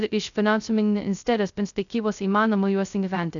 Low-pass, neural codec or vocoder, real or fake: 7.2 kHz; codec, 16 kHz, 0.2 kbps, FocalCodec; fake